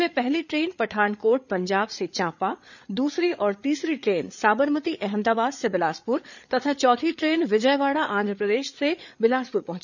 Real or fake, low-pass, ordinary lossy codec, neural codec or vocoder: fake; 7.2 kHz; none; codec, 16 kHz, 8 kbps, FreqCodec, larger model